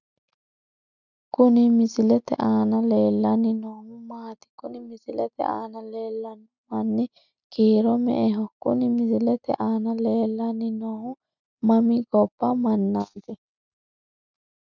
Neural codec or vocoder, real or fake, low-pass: none; real; 7.2 kHz